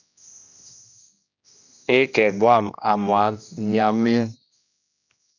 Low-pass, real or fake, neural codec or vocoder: 7.2 kHz; fake; codec, 16 kHz, 1 kbps, X-Codec, HuBERT features, trained on general audio